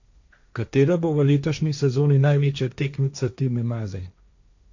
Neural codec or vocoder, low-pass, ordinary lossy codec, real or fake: codec, 16 kHz, 1.1 kbps, Voila-Tokenizer; 7.2 kHz; none; fake